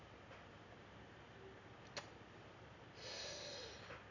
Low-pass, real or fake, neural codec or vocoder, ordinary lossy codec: 7.2 kHz; real; none; none